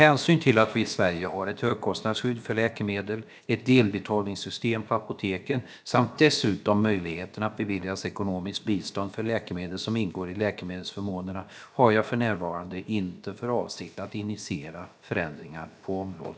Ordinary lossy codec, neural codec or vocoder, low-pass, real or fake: none; codec, 16 kHz, about 1 kbps, DyCAST, with the encoder's durations; none; fake